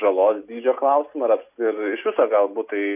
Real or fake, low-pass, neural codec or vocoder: real; 3.6 kHz; none